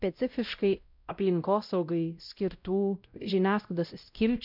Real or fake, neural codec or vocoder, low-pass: fake; codec, 16 kHz, 0.5 kbps, X-Codec, WavLM features, trained on Multilingual LibriSpeech; 5.4 kHz